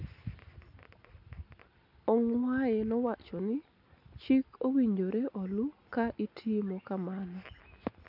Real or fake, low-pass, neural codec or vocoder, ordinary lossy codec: real; 5.4 kHz; none; none